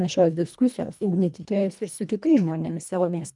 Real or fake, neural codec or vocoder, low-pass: fake; codec, 24 kHz, 1.5 kbps, HILCodec; 10.8 kHz